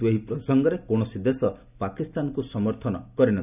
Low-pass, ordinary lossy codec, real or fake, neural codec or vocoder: 3.6 kHz; none; real; none